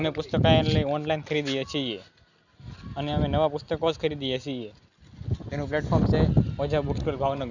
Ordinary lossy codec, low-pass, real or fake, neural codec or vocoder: none; 7.2 kHz; real; none